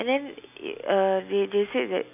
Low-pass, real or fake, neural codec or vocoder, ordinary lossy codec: 3.6 kHz; real; none; none